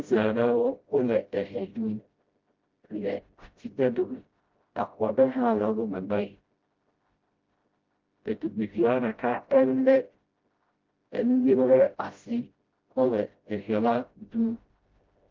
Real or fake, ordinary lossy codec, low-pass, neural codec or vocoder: fake; Opus, 32 kbps; 7.2 kHz; codec, 16 kHz, 0.5 kbps, FreqCodec, smaller model